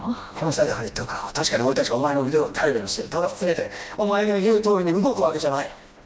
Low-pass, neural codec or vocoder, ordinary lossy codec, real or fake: none; codec, 16 kHz, 1 kbps, FreqCodec, smaller model; none; fake